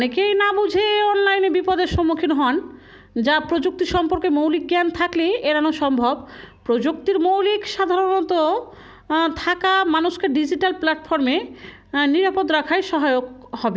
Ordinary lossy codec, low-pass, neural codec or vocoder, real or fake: none; none; none; real